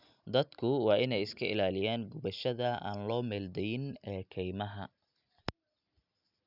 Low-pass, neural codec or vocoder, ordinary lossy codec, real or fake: 5.4 kHz; none; none; real